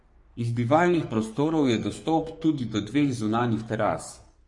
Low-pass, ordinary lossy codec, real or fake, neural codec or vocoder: 10.8 kHz; MP3, 48 kbps; fake; codec, 44.1 kHz, 3.4 kbps, Pupu-Codec